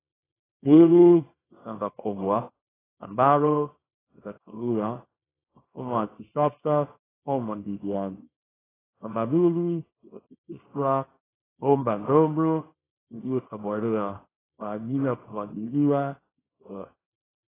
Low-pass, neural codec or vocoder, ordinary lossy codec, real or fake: 3.6 kHz; codec, 24 kHz, 0.9 kbps, WavTokenizer, small release; AAC, 16 kbps; fake